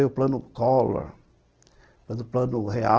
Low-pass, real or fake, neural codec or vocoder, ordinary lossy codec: 7.2 kHz; real; none; Opus, 16 kbps